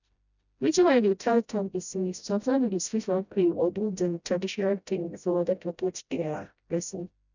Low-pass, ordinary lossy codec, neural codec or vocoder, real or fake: 7.2 kHz; none; codec, 16 kHz, 0.5 kbps, FreqCodec, smaller model; fake